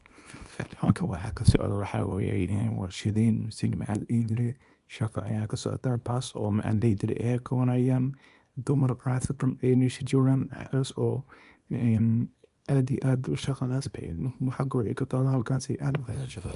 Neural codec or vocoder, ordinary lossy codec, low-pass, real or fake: codec, 24 kHz, 0.9 kbps, WavTokenizer, small release; none; 10.8 kHz; fake